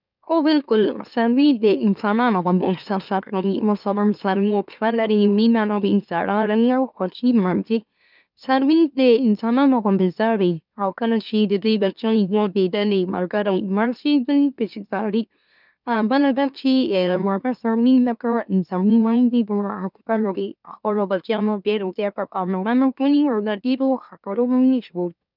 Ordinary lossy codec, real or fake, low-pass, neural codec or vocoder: none; fake; 5.4 kHz; autoencoder, 44.1 kHz, a latent of 192 numbers a frame, MeloTTS